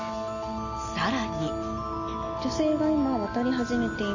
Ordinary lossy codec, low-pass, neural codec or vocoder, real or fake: MP3, 32 kbps; 7.2 kHz; none; real